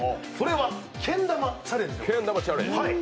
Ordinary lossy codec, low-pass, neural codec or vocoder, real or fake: none; none; none; real